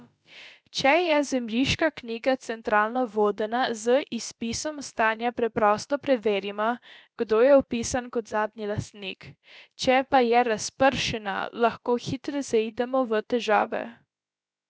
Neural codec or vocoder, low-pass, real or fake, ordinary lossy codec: codec, 16 kHz, about 1 kbps, DyCAST, with the encoder's durations; none; fake; none